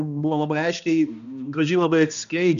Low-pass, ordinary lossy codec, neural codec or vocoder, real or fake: 7.2 kHz; MP3, 96 kbps; codec, 16 kHz, 1 kbps, X-Codec, HuBERT features, trained on balanced general audio; fake